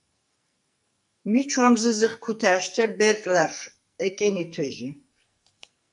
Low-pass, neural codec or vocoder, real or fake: 10.8 kHz; codec, 44.1 kHz, 2.6 kbps, SNAC; fake